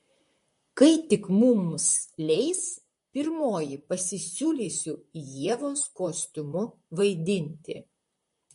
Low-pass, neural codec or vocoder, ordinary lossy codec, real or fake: 14.4 kHz; vocoder, 44.1 kHz, 128 mel bands, Pupu-Vocoder; MP3, 48 kbps; fake